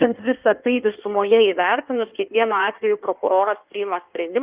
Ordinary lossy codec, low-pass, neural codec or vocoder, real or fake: Opus, 32 kbps; 3.6 kHz; codec, 16 kHz in and 24 kHz out, 1.1 kbps, FireRedTTS-2 codec; fake